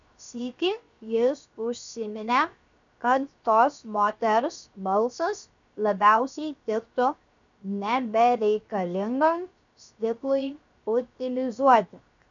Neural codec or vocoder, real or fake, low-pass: codec, 16 kHz, 0.7 kbps, FocalCodec; fake; 7.2 kHz